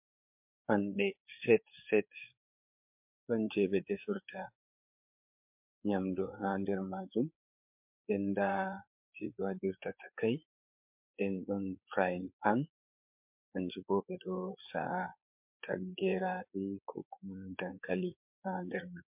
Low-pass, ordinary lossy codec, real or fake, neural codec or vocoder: 3.6 kHz; MP3, 32 kbps; fake; codec, 16 kHz, 4 kbps, FreqCodec, larger model